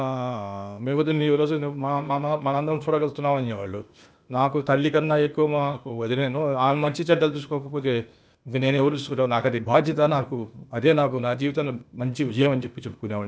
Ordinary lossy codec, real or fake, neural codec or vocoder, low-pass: none; fake; codec, 16 kHz, 0.8 kbps, ZipCodec; none